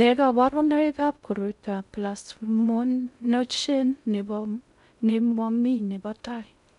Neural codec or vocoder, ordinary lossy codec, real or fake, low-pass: codec, 16 kHz in and 24 kHz out, 0.6 kbps, FocalCodec, streaming, 2048 codes; none; fake; 10.8 kHz